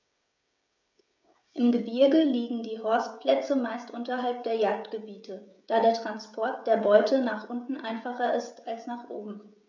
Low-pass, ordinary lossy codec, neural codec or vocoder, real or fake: 7.2 kHz; none; codec, 16 kHz, 16 kbps, FreqCodec, smaller model; fake